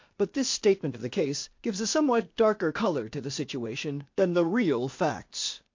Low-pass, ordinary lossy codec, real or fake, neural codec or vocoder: 7.2 kHz; MP3, 48 kbps; fake; codec, 16 kHz, 0.8 kbps, ZipCodec